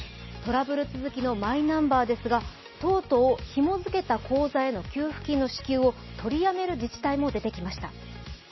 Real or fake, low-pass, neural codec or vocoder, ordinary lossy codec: real; 7.2 kHz; none; MP3, 24 kbps